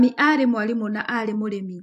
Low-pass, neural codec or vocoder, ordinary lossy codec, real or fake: 14.4 kHz; vocoder, 44.1 kHz, 128 mel bands every 256 samples, BigVGAN v2; AAC, 64 kbps; fake